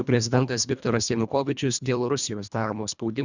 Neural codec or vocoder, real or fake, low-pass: codec, 24 kHz, 1.5 kbps, HILCodec; fake; 7.2 kHz